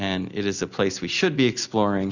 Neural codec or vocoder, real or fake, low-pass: none; real; 7.2 kHz